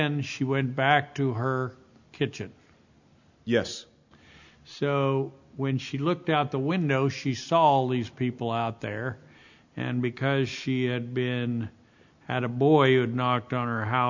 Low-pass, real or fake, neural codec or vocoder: 7.2 kHz; real; none